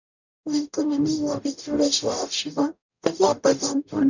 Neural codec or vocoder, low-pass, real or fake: codec, 44.1 kHz, 0.9 kbps, DAC; 7.2 kHz; fake